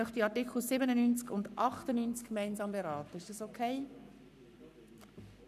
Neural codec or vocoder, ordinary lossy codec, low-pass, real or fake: codec, 44.1 kHz, 7.8 kbps, Pupu-Codec; none; 14.4 kHz; fake